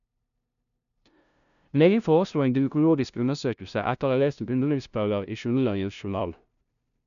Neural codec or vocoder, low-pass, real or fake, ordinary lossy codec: codec, 16 kHz, 0.5 kbps, FunCodec, trained on LibriTTS, 25 frames a second; 7.2 kHz; fake; none